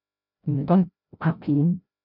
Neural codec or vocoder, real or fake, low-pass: codec, 16 kHz, 0.5 kbps, FreqCodec, larger model; fake; 5.4 kHz